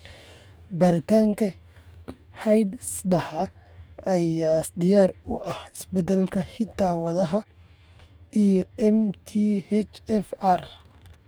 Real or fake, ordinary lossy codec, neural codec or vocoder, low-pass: fake; none; codec, 44.1 kHz, 2.6 kbps, DAC; none